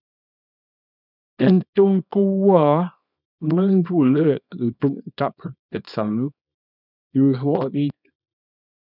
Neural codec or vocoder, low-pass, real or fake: codec, 24 kHz, 0.9 kbps, WavTokenizer, small release; 5.4 kHz; fake